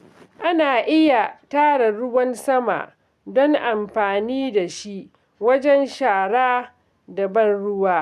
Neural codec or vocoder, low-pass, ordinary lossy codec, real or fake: none; 14.4 kHz; none; real